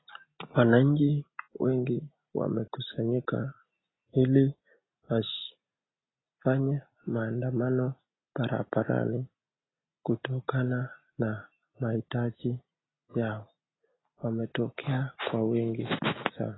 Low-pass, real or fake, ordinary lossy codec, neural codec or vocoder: 7.2 kHz; real; AAC, 16 kbps; none